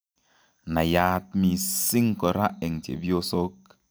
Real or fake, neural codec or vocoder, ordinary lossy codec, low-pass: real; none; none; none